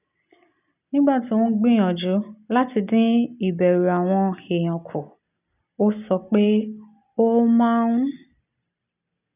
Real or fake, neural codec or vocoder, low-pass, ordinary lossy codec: real; none; 3.6 kHz; none